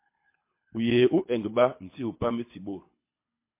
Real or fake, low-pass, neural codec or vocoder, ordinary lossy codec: fake; 3.6 kHz; codec, 24 kHz, 6 kbps, HILCodec; MP3, 24 kbps